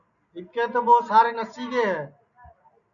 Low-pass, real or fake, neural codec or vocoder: 7.2 kHz; real; none